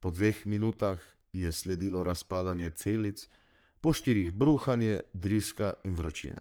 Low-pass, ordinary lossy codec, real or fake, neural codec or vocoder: none; none; fake; codec, 44.1 kHz, 3.4 kbps, Pupu-Codec